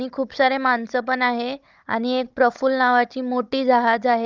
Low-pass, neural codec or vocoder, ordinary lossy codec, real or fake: 7.2 kHz; codec, 16 kHz, 16 kbps, FunCodec, trained on Chinese and English, 50 frames a second; Opus, 24 kbps; fake